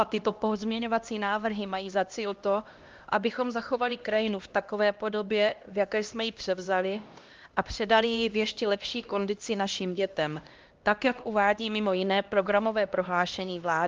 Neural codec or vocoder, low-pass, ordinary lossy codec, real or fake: codec, 16 kHz, 1 kbps, X-Codec, HuBERT features, trained on LibriSpeech; 7.2 kHz; Opus, 32 kbps; fake